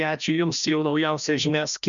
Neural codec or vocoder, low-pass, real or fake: codec, 16 kHz, 1 kbps, FreqCodec, larger model; 7.2 kHz; fake